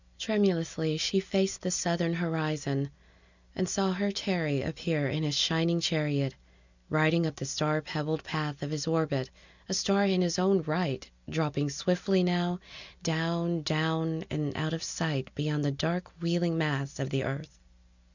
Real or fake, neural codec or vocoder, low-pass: real; none; 7.2 kHz